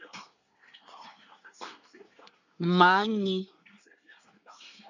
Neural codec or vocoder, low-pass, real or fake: codec, 24 kHz, 1 kbps, SNAC; 7.2 kHz; fake